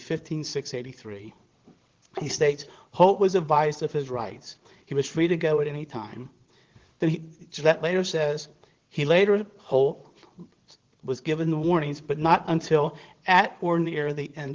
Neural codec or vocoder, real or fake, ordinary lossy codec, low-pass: vocoder, 22.05 kHz, 80 mel bands, WaveNeXt; fake; Opus, 16 kbps; 7.2 kHz